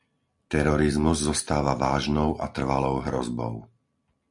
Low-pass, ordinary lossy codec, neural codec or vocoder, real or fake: 10.8 kHz; MP3, 64 kbps; none; real